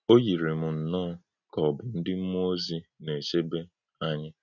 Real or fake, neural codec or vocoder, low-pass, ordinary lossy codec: real; none; 7.2 kHz; none